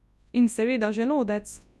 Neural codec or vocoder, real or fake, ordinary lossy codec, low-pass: codec, 24 kHz, 0.9 kbps, WavTokenizer, large speech release; fake; none; none